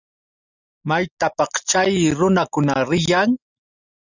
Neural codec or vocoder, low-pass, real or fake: none; 7.2 kHz; real